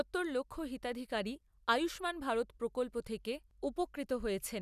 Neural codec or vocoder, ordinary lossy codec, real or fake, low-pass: none; none; real; 14.4 kHz